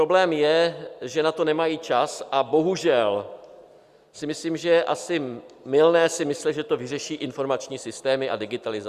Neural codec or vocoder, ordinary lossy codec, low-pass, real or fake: none; Opus, 64 kbps; 14.4 kHz; real